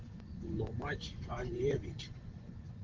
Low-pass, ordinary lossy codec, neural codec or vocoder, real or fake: 7.2 kHz; Opus, 16 kbps; vocoder, 24 kHz, 100 mel bands, Vocos; fake